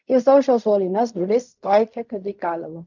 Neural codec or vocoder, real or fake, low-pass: codec, 16 kHz in and 24 kHz out, 0.4 kbps, LongCat-Audio-Codec, fine tuned four codebook decoder; fake; 7.2 kHz